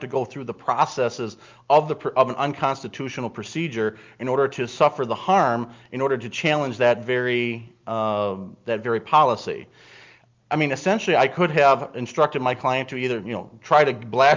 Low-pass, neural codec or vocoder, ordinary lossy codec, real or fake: 7.2 kHz; none; Opus, 24 kbps; real